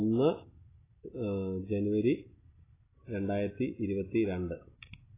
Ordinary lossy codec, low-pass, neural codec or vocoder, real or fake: AAC, 16 kbps; 3.6 kHz; none; real